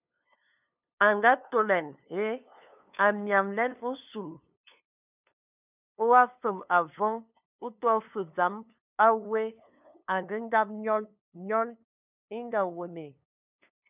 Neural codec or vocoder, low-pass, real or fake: codec, 16 kHz, 2 kbps, FunCodec, trained on LibriTTS, 25 frames a second; 3.6 kHz; fake